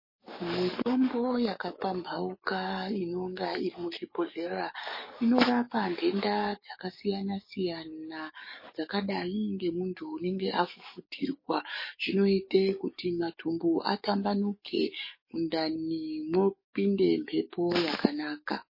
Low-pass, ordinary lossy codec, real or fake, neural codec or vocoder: 5.4 kHz; MP3, 24 kbps; fake; codec, 44.1 kHz, 7.8 kbps, DAC